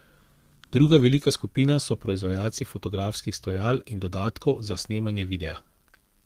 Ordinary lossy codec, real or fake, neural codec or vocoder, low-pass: Opus, 24 kbps; fake; codec, 32 kHz, 1.9 kbps, SNAC; 14.4 kHz